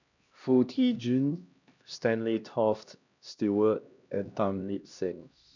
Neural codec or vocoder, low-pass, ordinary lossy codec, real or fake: codec, 16 kHz, 1 kbps, X-Codec, HuBERT features, trained on LibriSpeech; 7.2 kHz; none; fake